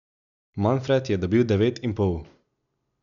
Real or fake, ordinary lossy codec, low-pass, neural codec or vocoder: real; none; 7.2 kHz; none